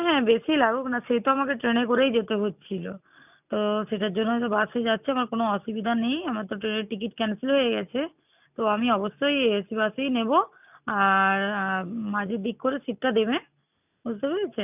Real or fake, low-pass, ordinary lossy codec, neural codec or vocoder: real; 3.6 kHz; none; none